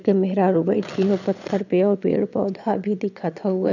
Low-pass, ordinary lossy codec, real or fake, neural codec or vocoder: 7.2 kHz; none; fake; vocoder, 22.05 kHz, 80 mel bands, Vocos